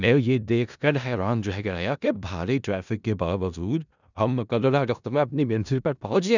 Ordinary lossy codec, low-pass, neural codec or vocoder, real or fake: none; 7.2 kHz; codec, 16 kHz in and 24 kHz out, 0.4 kbps, LongCat-Audio-Codec, four codebook decoder; fake